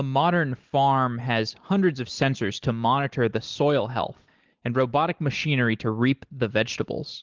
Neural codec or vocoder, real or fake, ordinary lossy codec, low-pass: none; real; Opus, 16 kbps; 7.2 kHz